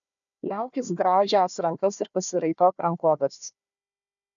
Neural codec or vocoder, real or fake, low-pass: codec, 16 kHz, 1 kbps, FunCodec, trained on Chinese and English, 50 frames a second; fake; 7.2 kHz